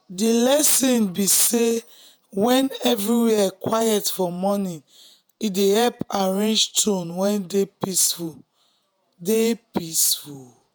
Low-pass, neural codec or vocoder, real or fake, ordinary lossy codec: none; vocoder, 48 kHz, 128 mel bands, Vocos; fake; none